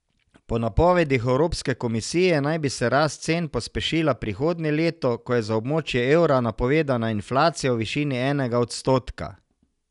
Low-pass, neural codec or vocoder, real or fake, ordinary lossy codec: 10.8 kHz; none; real; none